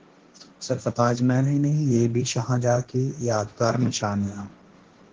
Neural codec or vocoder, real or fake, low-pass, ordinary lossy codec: codec, 16 kHz, 1.1 kbps, Voila-Tokenizer; fake; 7.2 kHz; Opus, 16 kbps